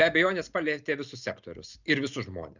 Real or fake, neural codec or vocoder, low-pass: real; none; 7.2 kHz